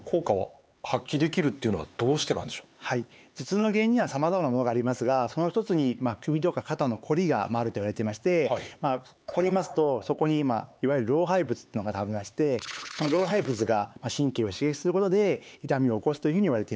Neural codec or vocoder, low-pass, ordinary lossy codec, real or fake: codec, 16 kHz, 4 kbps, X-Codec, HuBERT features, trained on LibriSpeech; none; none; fake